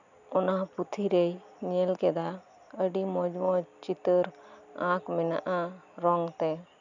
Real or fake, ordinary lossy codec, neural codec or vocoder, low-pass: real; none; none; 7.2 kHz